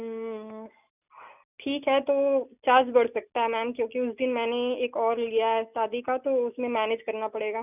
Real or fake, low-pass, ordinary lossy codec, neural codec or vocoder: real; 3.6 kHz; none; none